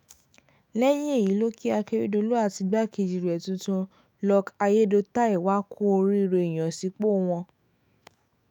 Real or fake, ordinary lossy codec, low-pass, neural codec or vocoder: fake; none; none; autoencoder, 48 kHz, 128 numbers a frame, DAC-VAE, trained on Japanese speech